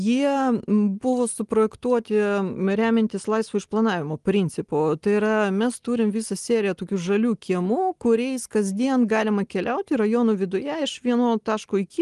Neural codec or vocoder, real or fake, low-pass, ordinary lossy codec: none; real; 10.8 kHz; Opus, 24 kbps